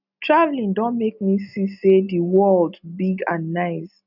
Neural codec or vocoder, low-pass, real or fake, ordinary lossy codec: none; 5.4 kHz; real; none